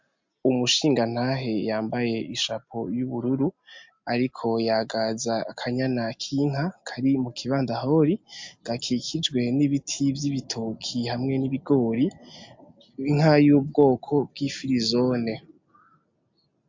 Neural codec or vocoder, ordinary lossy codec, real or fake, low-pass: none; MP3, 48 kbps; real; 7.2 kHz